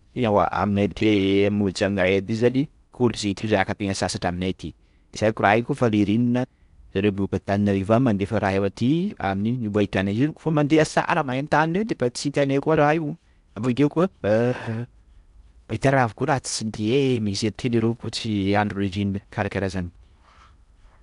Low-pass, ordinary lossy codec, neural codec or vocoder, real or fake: 10.8 kHz; none; codec, 16 kHz in and 24 kHz out, 0.8 kbps, FocalCodec, streaming, 65536 codes; fake